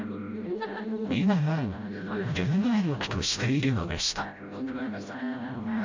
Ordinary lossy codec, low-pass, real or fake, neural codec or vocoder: MP3, 48 kbps; 7.2 kHz; fake; codec, 16 kHz, 0.5 kbps, FreqCodec, smaller model